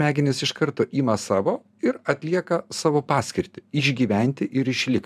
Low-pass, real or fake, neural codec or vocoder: 14.4 kHz; fake; vocoder, 44.1 kHz, 128 mel bands every 512 samples, BigVGAN v2